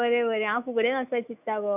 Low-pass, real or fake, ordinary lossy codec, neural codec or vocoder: 3.6 kHz; fake; none; autoencoder, 48 kHz, 128 numbers a frame, DAC-VAE, trained on Japanese speech